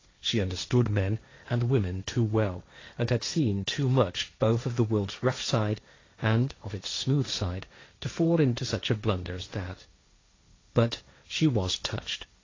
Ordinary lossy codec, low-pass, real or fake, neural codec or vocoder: AAC, 32 kbps; 7.2 kHz; fake; codec, 16 kHz, 1.1 kbps, Voila-Tokenizer